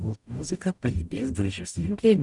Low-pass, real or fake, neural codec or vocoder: 10.8 kHz; fake; codec, 44.1 kHz, 0.9 kbps, DAC